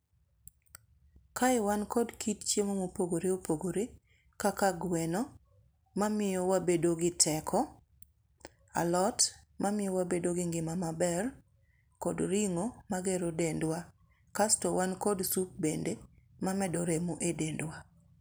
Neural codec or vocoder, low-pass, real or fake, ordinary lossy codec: none; none; real; none